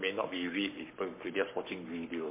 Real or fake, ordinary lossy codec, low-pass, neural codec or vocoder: fake; MP3, 24 kbps; 3.6 kHz; codec, 44.1 kHz, 7.8 kbps, Pupu-Codec